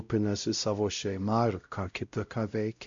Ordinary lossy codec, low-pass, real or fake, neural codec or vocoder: MP3, 64 kbps; 7.2 kHz; fake; codec, 16 kHz, 0.5 kbps, X-Codec, WavLM features, trained on Multilingual LibriSpeech